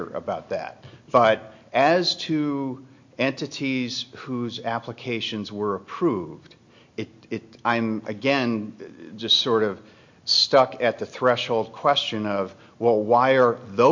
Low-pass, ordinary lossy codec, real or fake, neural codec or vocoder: 7.2 kHz; MP3, 48 kbps; real; none